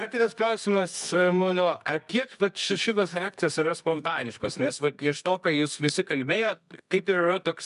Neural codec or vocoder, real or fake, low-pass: codec, 24 kHz, 0.9 kbps, WavTokenizer, medium music audio release; fake; 10.8 kHz